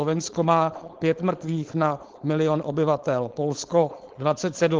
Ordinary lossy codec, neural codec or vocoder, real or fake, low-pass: Opus, 16 kbps; codec, 16 kHz, 4.8 kbps, FACodec; fake; 7.2 kHz